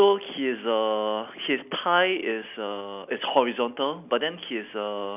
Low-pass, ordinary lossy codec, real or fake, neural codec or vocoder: 3.6 kHz; none; real; none